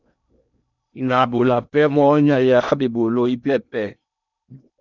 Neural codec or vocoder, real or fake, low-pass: codec, 16 kHz in and 24 kHz out, 0.8 kbps, FocalCodec, streaming, 65536 codes; fake; 7.2 kHz